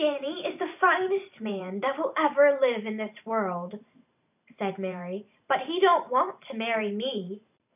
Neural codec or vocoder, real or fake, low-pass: vocoder, 44.1 kHz, 128 mel bands every 512 samples, BigVGAN v2; fake; 3.6 kHz